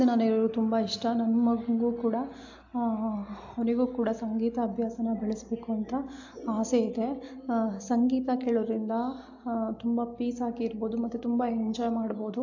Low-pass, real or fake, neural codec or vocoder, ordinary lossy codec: 7.2 kHz; real; none; AAC, 48 kbps